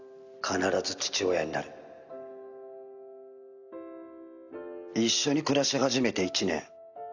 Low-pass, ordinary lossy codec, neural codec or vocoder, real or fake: 7.2 kHz; none; none; real